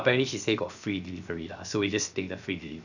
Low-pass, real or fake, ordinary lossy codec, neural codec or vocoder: 7.2 kHz; fake; none; codec, 16 kHz, 0.7 kbps, FocalCodec